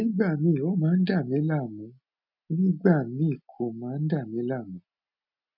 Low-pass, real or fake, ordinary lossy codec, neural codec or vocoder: 5.4 kHz; real; none; none